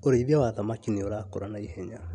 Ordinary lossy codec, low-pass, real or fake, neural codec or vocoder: none; 9.9 kHz; real; none